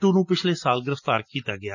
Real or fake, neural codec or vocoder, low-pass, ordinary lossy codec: real; none; 7.2 kHz; none